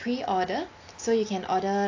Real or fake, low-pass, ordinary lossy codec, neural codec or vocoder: real; 7.2 kHz; AAC, 48 kbps; none